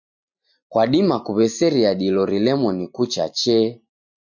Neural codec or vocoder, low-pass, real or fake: none; 7.2 kHz; real